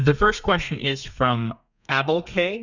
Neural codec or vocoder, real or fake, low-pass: codec, 32 kHz, 1.9 kbps, SNAC; fake; 7.2 kHz